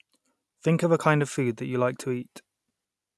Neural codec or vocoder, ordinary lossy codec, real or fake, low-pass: none; none; real; none